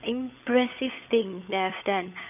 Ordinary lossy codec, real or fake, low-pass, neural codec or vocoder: none; fake; 3.6 kHz; codec, 16 kHz, 16 kbps, FunCodec, trained on LibriTTS, 50 frames a second